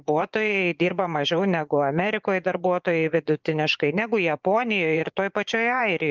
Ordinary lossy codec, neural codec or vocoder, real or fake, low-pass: Opus, 24 kbps; vocoder, 24 kHz, 100 mel bands, Vocos; fake; 7.2 kHz